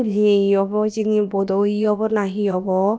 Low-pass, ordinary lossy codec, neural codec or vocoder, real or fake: none; none; codec, 16 kHz, about 1 kbps, DyCAST, with the encoder's durations; fake